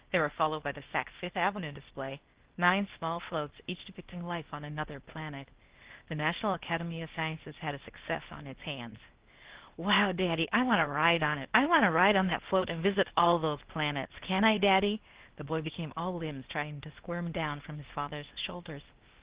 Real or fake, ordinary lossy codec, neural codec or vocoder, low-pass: fake; Opus, 16 kbps; codec, 16 kHz, 0.8 kbps, ZipCodec; 3.6 kHz